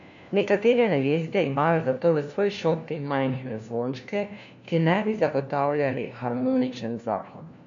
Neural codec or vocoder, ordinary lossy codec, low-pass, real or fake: codec, 16 kHz, 1 kbps, FunCodec, trained on LibriTTS, 50 frames a second; MP3, 64 kbps; 7.2 kHz; fake